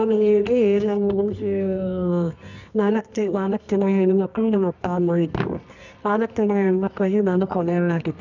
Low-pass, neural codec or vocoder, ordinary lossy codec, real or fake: 7.2 kHz; codec, 24 kHz, 0.9 kbps, WavTokenizer, medium music audio release; none; fake